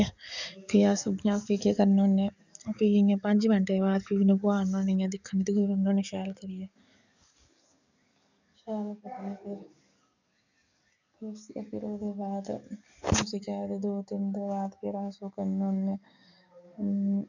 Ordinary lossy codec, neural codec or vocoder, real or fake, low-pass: none; codec, 44.1 kHz, 7.8 kbps, DAC; fake; 7.2 kHz